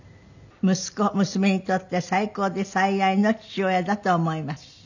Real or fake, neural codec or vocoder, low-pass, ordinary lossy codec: real; none; 7.2 kHz; none